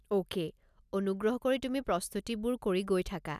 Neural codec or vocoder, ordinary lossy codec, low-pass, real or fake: none; none; 14.4 kHz; real